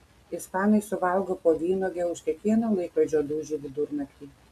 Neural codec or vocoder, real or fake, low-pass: none; real; 14.4 kHz